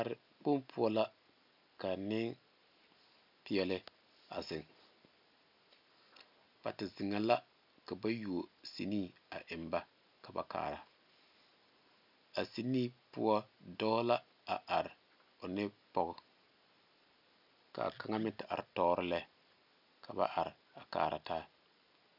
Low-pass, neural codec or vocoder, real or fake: 5.4 kHz; none; real